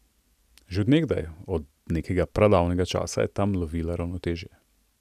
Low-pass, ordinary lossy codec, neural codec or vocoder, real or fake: 14.4 kHz; none; none; real